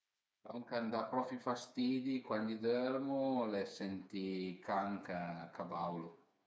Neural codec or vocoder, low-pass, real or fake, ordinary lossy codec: codec, 16 kHz, 4 kbps, FreqCodec, smaller model; none; fake; none